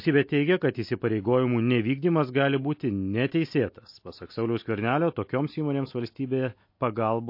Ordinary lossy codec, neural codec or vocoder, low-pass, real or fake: MP3, 32 kbps; none; 5.4 kHz; real